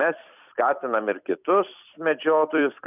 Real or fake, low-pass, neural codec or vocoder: fake; 3.6 kHz; vocoder, 44.1 kHz, 128 mel bands every 256 samples, BigVGAN v2